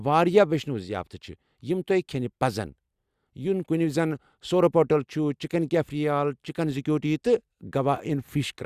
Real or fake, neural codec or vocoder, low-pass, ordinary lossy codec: real; none; 14.4 kHz; Opus, 64 kbps